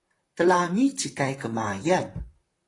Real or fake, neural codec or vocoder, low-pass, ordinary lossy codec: fake; vocoder, 44.1 kHz, 128 mel bands, Pupu-Vocoder; 10.8 kHz; AAC, 32 kbps